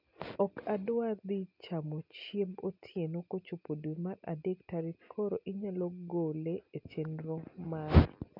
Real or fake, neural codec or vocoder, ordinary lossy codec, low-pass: real; none; none; 5.4 kHz